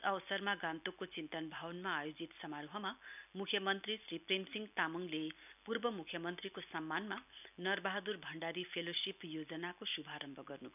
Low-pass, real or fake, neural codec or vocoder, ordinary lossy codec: 3.6 kHz; real; none; none